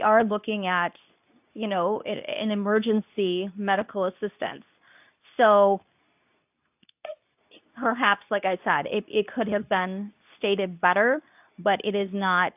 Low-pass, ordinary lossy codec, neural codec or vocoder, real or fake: 3.6 kHz; AAC, 32 kbps; codec, 24 kHz, 0.9 kbps, WavTokenizer, medium speech release version 2; fake